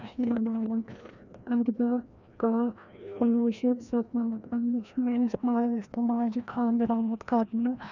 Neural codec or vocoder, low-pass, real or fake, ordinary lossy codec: codec, 16 kHz, 1 kbps, FreqCodec, larger model; 7.2 kHz; fake; none